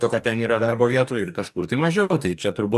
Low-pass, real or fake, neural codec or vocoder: 14.4 kHz; fake; codec, 44.1 kHz, 2.6 kbps, DAC